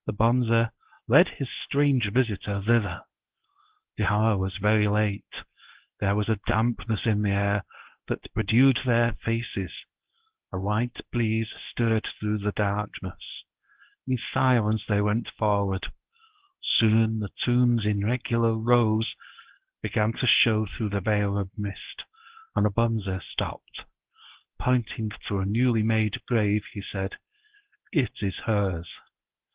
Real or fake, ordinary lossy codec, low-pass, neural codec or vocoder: fake; Opus, 16 kbps; 3.6 kHz; codec, 24 kHz, 0.9 kbps, WavTokenizer, medium speech release version 1